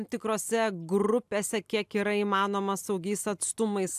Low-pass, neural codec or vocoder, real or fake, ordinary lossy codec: 14.4 kHz; none; real; AAC, 96 kbps